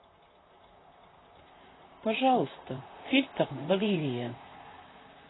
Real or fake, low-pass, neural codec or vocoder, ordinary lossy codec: fake; 7.2 kHz; vocoder, 44.1 kHz, 128 mel bands, Pupu-Vocoder; AAC, 16 kbps